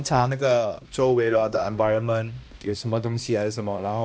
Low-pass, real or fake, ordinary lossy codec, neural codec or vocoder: none; fake; none; codec, 16 kHz, 1 kbps, X-Codec, HuBERT features, trained on balanced general audio